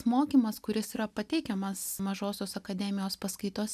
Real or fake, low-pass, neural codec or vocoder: real; 14.4 kHz; none